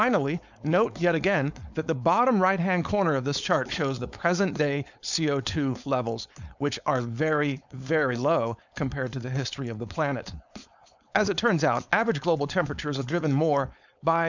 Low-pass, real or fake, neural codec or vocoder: 7.2 kHz; fake; codec, 16 kHz, 4.8 kbps, FACodec